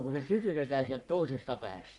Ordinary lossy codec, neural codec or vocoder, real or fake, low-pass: none; codec, 44.1 kHz, 1.7 kbps, Pupu-Codec; fake; 10.8 kHz